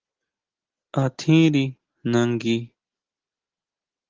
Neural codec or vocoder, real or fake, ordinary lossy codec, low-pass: none; real; Opus, 16 kbps; 7.2 kHz